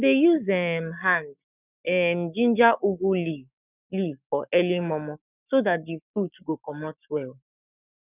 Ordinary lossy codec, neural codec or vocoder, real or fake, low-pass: none; codec, 44.1 kHz, 7.8 kbps, Pupu-Codec; fake; 3.6 kHz